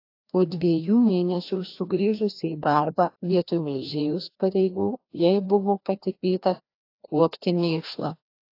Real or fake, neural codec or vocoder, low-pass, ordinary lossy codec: fake; codec, 16 kHz, 1 kbps, FreqCodec, larger model; 5.4 kHz; AAC, 32 kbps